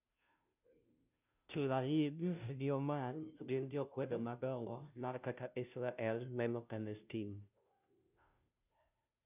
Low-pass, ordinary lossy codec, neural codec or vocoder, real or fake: 3.6 kHz; none; codec, 16 kHz, 0.5 kbps, FunCodec, trained on Chinese and English, 25 frames a second; fake